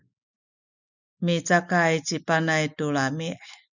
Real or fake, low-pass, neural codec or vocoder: real; 7.2 kHz; none